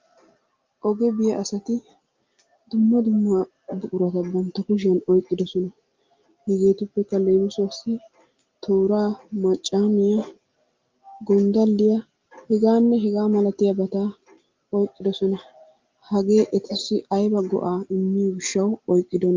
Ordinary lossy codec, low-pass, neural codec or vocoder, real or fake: Opus, 24 kbps; 7.2 kHz; none; real